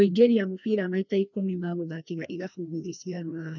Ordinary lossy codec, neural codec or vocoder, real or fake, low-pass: none; codec, 16 kHz, 1 kbps, FreqCodec, larger model; fake; 7.2 kHz